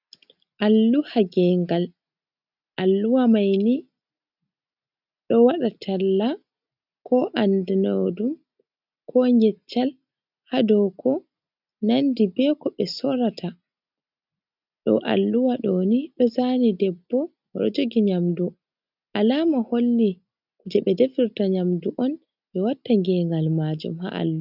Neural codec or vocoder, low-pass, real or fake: none; 5.4 kHz; real